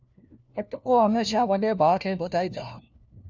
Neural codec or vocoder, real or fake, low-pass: codec, 16 kHz, 1 kbps, FunCodec, trained on LibriTTS, 50 frames a second; fake; 7.2 kHz